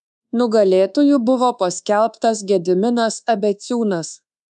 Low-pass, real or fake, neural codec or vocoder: 10.8 kHz; fake; codec, 24 kHz, 1.2 kbps, DualCodec